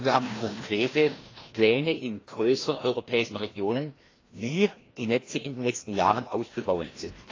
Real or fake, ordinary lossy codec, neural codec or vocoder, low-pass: fake; AAC, 32 kbps; codec, 16 kHz, 1 kbps, FreqCodec, larger model; 7.2 kHz